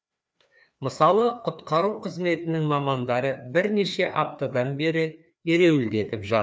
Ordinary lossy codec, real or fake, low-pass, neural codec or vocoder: none; fake; none; codec, 16 kHz, 2 kbps, FreqCodec, larger model